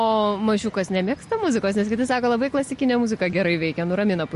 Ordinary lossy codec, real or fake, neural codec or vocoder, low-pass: MP3, 48 kbps; real; none; 14.4 kHz